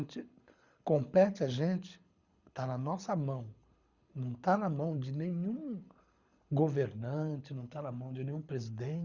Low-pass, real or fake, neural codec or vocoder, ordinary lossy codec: 7.2 kHz; fake; codec, 24 kHz, 6 kbps, HILCodec; Opus, 64 kbps